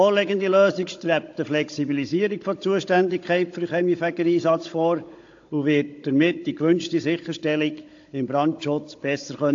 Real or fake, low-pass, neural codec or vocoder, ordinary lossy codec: fake; 7.2 kHz; codec, 16 kHz, 16 kbps, FunCodec, trained on Chinese and English, 50 frames a second; AAC, 48 kbps